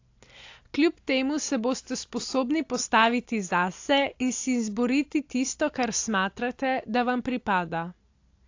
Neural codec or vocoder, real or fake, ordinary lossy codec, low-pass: none; real; AAC, 48 kbps; 7.2 kHz